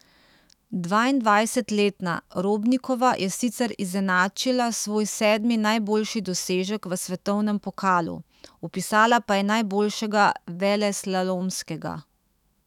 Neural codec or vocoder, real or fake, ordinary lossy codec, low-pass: autoencoder, 48 kHz, 128 numbers a frame, DAC-VAE, trained on Japanese speech; fake; none; 19.8 kHz